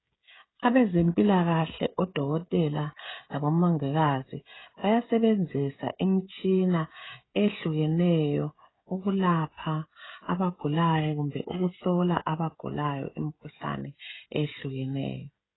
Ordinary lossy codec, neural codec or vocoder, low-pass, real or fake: AAC, 16 kbps; codec, 16 kHz, 16 kbps, FreqCodec, smaller model; 7.2 kHz; fake